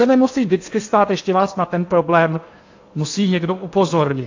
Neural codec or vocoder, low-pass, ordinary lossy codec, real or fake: codec, 16 kHz in and 24 kHz out, 0.8 kbps, FocalCodec, streaming, 65536 codes; 7.2 kHz; AAC, 48 kbps; fake